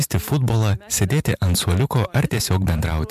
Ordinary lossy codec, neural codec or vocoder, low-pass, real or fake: MP3, 96 kbps; vocoder, 44.1 kHz, 128 mel bands every 256 samples, BigVGAN v2; 14.4 kHz; fake